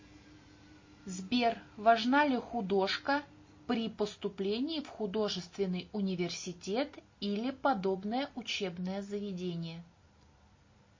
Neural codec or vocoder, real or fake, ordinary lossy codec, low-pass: none; real; MP3, 32 kbps; 7.2 kHz